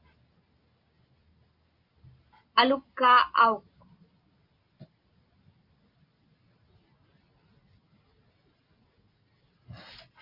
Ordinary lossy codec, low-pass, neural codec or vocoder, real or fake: Opus, 64 kbps; 5.4 kHz; none; real